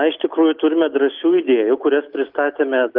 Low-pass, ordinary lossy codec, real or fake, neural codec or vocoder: 5.4 kHz; Opus, 24 kbps; real; none